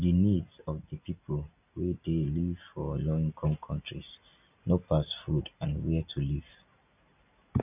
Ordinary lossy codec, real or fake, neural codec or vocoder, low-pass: none; real; none; 3.6 kHz